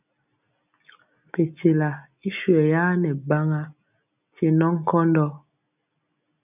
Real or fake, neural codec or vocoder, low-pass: real; none; 3.6 kHz